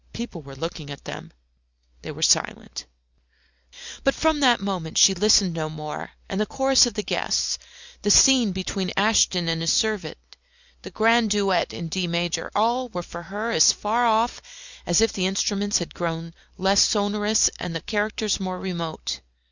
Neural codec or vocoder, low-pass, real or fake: none; 7.2 kHz; real